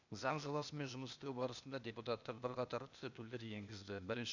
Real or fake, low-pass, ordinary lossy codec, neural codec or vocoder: fake; 7.2 kHz; none; codec, 16 kHz, 0.8 kbps, ZipCodec